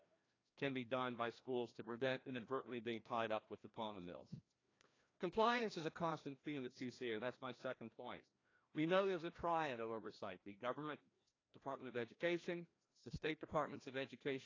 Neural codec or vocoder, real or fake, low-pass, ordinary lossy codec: codec, 16 kHz, 1 kbps, FreqCodec, larger model; fake; 7.2 kHz; AAC, 32 kbps